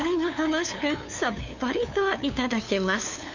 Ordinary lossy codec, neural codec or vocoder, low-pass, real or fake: none; codec, 16 kHz, 2 kbps, FunCodec, trained on LibriTTS, 25 frames a second; 7.2 kHz; fake